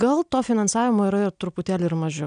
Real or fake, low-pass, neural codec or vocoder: real; 9.9 kHz; none